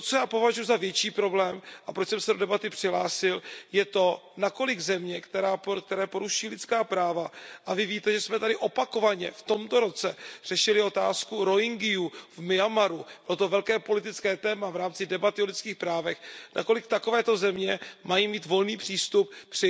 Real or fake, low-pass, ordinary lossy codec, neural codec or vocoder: real; none; none; none